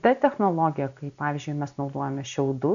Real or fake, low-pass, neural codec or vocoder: real; 7.2 kHz; none